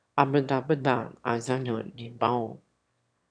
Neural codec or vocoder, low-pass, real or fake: autoencoder, 22.05 kHz, a latent of 192 numbers a frame, VITS, trained on one speaker; 9.9 kHz; fake